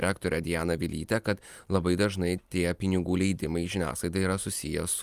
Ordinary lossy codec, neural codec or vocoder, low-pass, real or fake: Opus, 32 kbps; none; 14.4 kHz; real